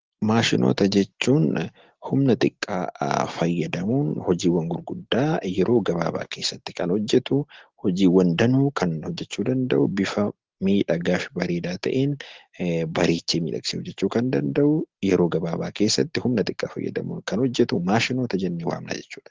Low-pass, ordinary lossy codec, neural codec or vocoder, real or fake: 7.2 kHz; Opus, 16 kbps; none; real